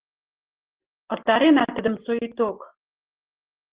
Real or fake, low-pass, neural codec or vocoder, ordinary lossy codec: real; 3.6 kHz; none; Opus, 16 kbps